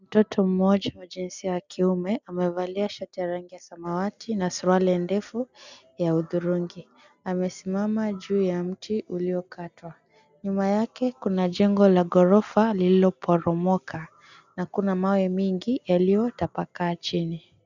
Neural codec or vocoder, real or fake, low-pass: none; real; 7.2 kHz